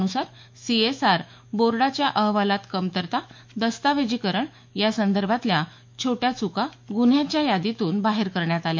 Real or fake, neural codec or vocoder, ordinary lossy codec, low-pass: fake; autoencoder, 48 kHz, 128 numbers a frame, DAC-VAE, trained on Japanese speech; MP3, 48 kbps; 7.2 kHz